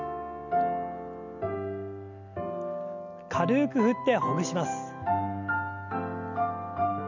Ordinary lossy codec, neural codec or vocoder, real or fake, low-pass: none; none; real; 7.2 kHz